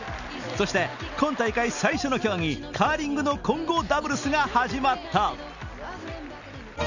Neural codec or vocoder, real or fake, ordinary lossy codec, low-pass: none; real; none; 7.2 kHz